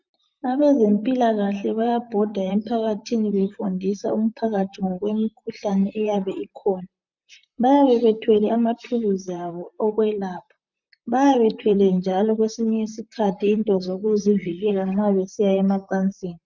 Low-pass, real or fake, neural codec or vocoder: 7.2 kHz; fake; vocoder, 44.1 kHz, 128 mel bands, Pupu-Vocoder